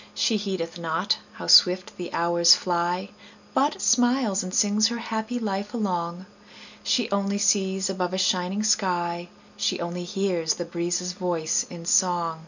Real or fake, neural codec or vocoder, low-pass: real; none; 7.2 kHz